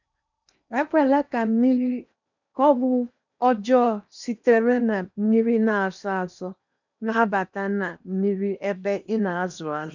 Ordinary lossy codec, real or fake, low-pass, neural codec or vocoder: none; fake; 7.2 kHz; codec, 16 kHz in and 24 kHz out, 0.8 kbps, FocalCodec, streaming, 65536 codes